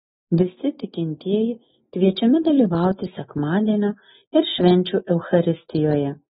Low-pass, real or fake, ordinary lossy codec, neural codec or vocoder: 7.2 kHz; real; AAC, 16 kbps; none